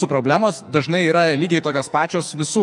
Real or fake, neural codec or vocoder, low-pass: fake; codec, 44.1 kHz, 2.6 kbps, SNAC; 10.8 kHz